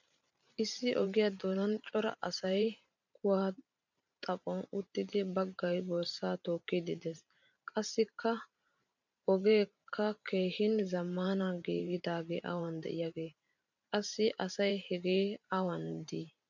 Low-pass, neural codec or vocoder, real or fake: 7.2 kHz; vocoder, 44.1 kHz, 128 mel bands every 512 samples, BigVGAN v2; fake